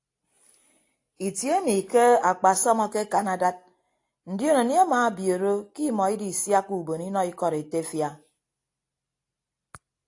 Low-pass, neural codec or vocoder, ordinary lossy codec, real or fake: 10.8 kHz; none; AAC, 48 kbps; real